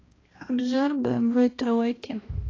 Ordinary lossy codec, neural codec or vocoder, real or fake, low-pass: AAC, 32 kbps; codec, 16 kHz, 1 kbps, X-Codec, HuBERT features, trained on balanced general audio; fake; 7.2 kHz